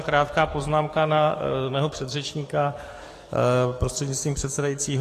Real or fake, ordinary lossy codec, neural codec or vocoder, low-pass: fake; AAC, 48 kbps; codec, 44.1 kHz, 7.8 kbps, DAC; 14.4 kHz